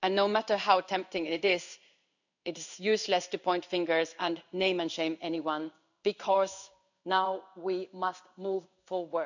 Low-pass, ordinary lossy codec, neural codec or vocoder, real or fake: 7.2 kHz; none; codec, 16 kHz in and 24 kHz out, 1 kbps, XY-Tokenizer; fake